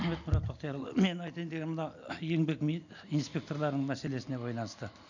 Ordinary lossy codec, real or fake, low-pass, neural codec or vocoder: none; real; 7.2 kHz; none